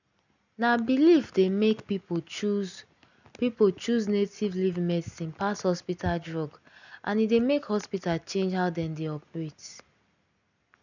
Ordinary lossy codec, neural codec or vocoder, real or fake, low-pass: none; none; real; 7.2 kHz